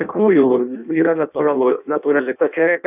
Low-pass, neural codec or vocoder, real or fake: 3.6 kHz; codec, 16 kHz in and 24 kHz out, 0.6 kbps, FireRedTTS-2 codec; fake